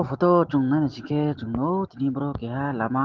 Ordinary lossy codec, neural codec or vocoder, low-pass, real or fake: Opus, 16 kbps; none; 7.2 kHz; real